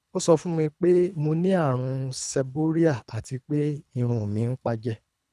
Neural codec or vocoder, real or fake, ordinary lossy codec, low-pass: codec, 24 kHz, 3 kbps, HILCodec; fake; none; none